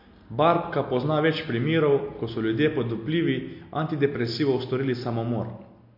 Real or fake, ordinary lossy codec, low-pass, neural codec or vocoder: real; MP3, 32 kbps; 5.4 kHz; none